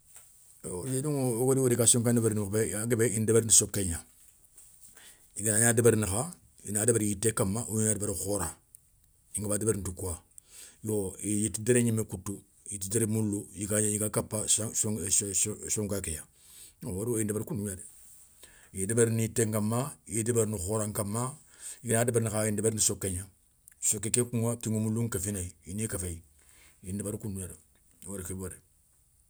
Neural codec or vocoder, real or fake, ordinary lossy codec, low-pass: none; real; none; none